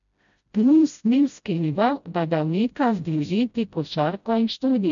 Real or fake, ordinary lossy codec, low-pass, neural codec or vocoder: fake; none; 7.2 kHz; codec, 16 kHz, 0.5 kbps, FreqCodec, smaller model